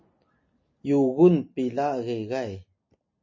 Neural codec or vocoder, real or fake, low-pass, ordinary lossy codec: none; real; 7.2 kHz; MP3, 32 kbps